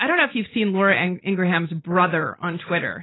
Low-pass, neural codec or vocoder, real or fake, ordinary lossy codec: 7.2 kHz; none; real; AAC, 16 kbps